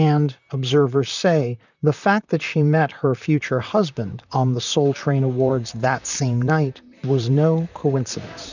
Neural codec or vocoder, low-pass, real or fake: vocoder, 44.1 kHz, 128 mel bands, Pupu-Vocoder; 7.2 kHz; fake